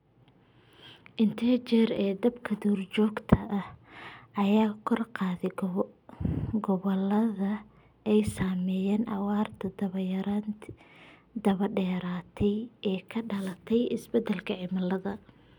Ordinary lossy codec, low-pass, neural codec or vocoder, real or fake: none; 19.8 kHz; none; real